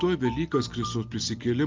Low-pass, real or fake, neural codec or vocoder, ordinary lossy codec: 7.2 kHz; real; none; Opus, 24 kbps